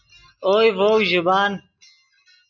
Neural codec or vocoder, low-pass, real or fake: none; 7.2 kHz; real